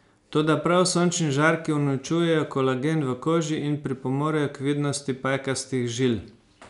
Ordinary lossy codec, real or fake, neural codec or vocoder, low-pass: none; real; none; 10.8 kHz